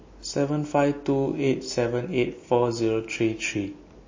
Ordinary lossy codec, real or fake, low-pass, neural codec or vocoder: MP3, 32 kbps; real; 7.2 kHz; none